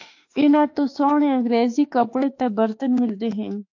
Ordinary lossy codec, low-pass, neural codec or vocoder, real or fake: AAC, 48 kbps; 7.2 kHz; autoencoder, 48 kHz, 32 numbers a frame, DAC-VAE, trained on Japanese speech; fake